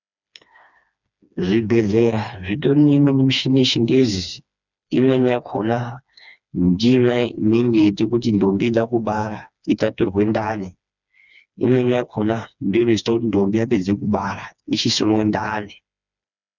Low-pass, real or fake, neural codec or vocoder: 7.2 kHz; fake; codec, 16 kHz, 2 kbps, FreqCodec, smaller model